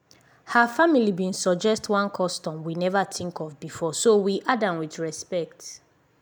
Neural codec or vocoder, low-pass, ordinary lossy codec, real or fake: none; none; none; real